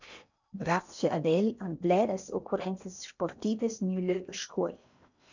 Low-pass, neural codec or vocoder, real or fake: 7.2 kHz; codec, 16 kHz in and 24 kHz out, 0.8 kbps, FocalCodec, streaming, 65536 codes; fake